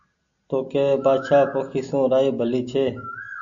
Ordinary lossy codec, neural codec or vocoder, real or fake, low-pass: AAC, 64 kbps; none; real; 7.2 kHz